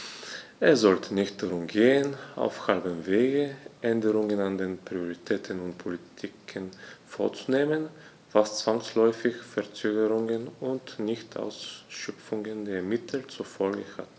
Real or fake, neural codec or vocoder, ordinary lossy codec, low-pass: real; none; none; none